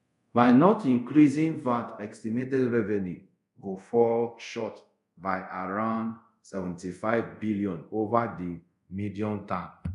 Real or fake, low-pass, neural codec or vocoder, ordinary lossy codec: fake; 10.8 kHz; codec, 24 kHz, 0.5 kbps, DualCodec; none